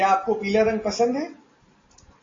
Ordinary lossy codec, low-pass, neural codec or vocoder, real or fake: AAC, 32 kbps; 7.2 kHz; none; real